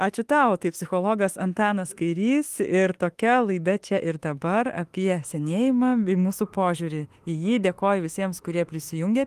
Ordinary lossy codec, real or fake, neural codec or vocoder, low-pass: Opus, 24 kbps; fake; autoencoder, 48 kHz, 32 numbers a frame, DAC-VAE, trained on Japanese speech; 14.4 kHz